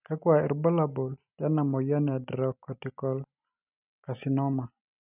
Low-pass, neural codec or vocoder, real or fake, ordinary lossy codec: 3.6 kHz; none; real; none